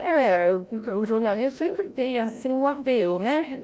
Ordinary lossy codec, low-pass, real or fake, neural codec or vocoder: none; none; fake; codec, 16 kHz, 0.5 kbps, FreqCodec, larger model